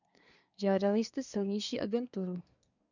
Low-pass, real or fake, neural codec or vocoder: 7.2 kHz; fake; codec, 24 kHz, 1 kbps, SNAC